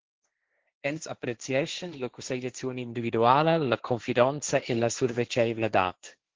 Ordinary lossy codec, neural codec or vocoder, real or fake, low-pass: Opus, 16 kbps; codec, 16 kHz, 1.1 kbps, Voila-Tokenizer; fake; 7.2 kHz